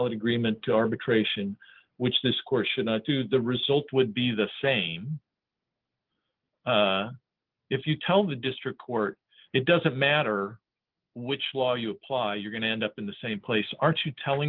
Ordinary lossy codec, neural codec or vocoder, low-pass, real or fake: Opus, 32 kbps; none; 5.4 kHz; real